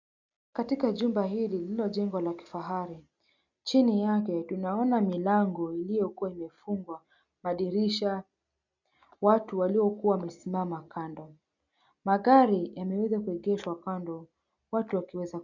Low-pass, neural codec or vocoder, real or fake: 7.2 kHz; none; real